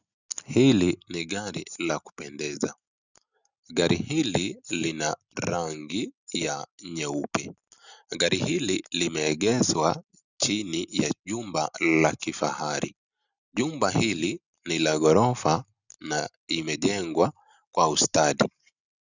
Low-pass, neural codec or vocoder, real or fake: 7.2 kHz; none; real